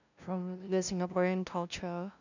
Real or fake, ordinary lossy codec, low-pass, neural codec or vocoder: fake; none; 7.2 kHz; codec, 16 kHz, 0.5 kbps, FunCodec, trained on LibriTTS, 25 frames a second